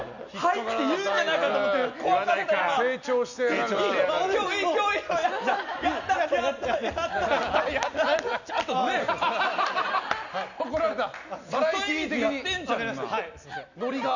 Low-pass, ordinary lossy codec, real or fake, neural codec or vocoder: 7.2 kHz; none; real; none